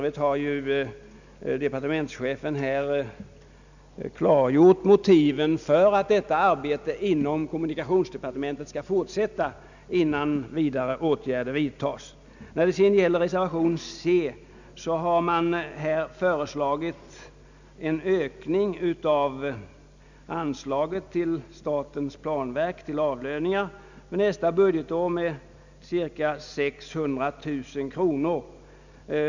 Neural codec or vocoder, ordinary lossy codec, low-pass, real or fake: none; none; 7.2 kHz; real